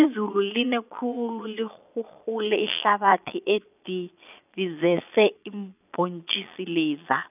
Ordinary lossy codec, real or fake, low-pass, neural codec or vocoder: none; fake; 3.6 kHz; vocoder, 22.05 kHz, 80 mel bands, Vocos